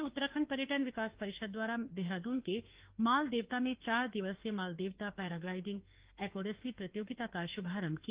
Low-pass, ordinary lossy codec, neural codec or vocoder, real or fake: 3.6 kHz; Opus, 16 kbps; autoencoder, 48 kHz, 32 numbers a frame, DAC-VAE, trained on Japanese speech; fake